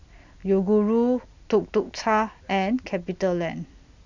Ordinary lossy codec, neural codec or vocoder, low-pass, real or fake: none; none; 7.2 kHz; real